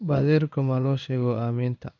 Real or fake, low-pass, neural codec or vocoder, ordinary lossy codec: fake; 7.2 kHz; codec, 16 kHz in and 24 kHz out, 1 kbps, XY-Tokenizer; none